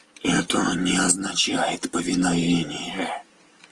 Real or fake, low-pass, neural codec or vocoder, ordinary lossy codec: real; 10.8 kHz; none; Opus, 24 kbps